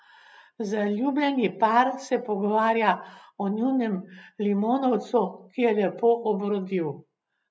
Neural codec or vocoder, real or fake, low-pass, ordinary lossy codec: none; real; none; none